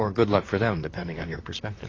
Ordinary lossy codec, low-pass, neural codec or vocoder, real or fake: AAC, 32 kbps; 7.2 kHz; vocoder, 44.1 kHz, 128 mel bands, Pupu-Vocoder; fake